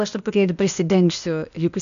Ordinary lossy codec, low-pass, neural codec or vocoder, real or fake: MP3, 96 kbps; 7.2 kHz; codec, 16 kHz, 0.8 kbps, ZipCodec; fake